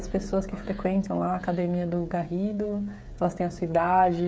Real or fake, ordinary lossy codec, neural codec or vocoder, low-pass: fake; none; codec, 16 kHz, 16 kbps, FreqCodec, smaller model; none